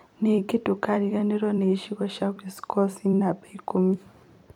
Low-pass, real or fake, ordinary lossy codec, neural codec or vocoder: 19.8 kHz; fake; none; vocoder, 44.1 kHz, 128 mel bands every 256 samples, BigVGAN v2